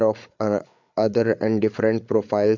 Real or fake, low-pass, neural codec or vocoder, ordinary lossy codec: fake; 7.2 kHz; codec, 16 kHz, 8 kbps, FreqCodec, larger model; MP3, 64 kbps